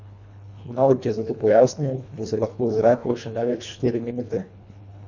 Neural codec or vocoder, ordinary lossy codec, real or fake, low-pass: codec, 24 kHz, 1.5 kbps, HILCodec; none; fake; 7.2 kHz